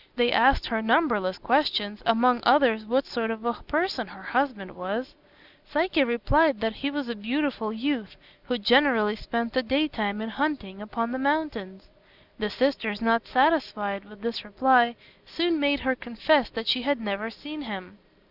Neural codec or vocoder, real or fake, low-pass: none; real; 5.4 kHz